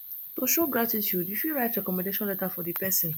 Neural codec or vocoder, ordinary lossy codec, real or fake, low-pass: none; none; real; none